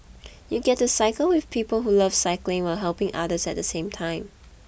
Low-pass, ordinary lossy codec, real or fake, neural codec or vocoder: none; none; real; none